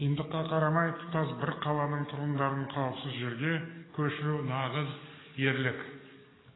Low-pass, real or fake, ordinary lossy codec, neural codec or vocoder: 7.2 kHz; fake; AAC, 16 kbps; codec, 44.1 kHz, 7.8 kbps, DAC